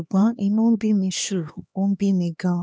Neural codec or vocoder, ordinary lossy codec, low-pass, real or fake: codec, 16 kHz, 2 kbps, X-Codec, HuBERT features, trained on LibriSpeech; none; none; fake